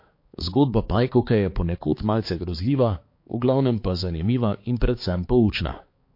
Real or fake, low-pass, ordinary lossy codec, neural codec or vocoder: fake; 5.4 kHz; MP3, 32 kbps; codec, 16 kHz, 4 kbps, X-Codec, HuBERT features, trained on balanced general audio